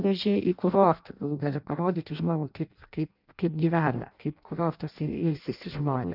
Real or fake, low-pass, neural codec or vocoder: fake; 5.4 kHz; codec, 16 kHz in and 24 kHz out, 0.6 kbps, FireRedTTS-2 codec